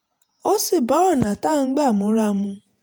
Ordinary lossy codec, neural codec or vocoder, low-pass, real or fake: none; vocoder, 48 kHz, 128 mel bands, Vocos; none; fake